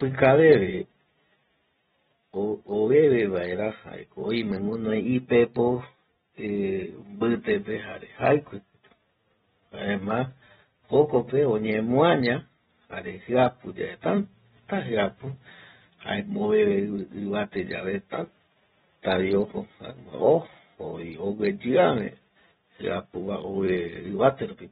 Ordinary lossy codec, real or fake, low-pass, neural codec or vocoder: AAC, 16 kbps; real; 19.8 kHz; none